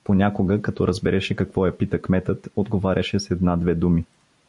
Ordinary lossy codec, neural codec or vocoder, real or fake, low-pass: MP3, 64 kbps; none; real; 10.8 kHz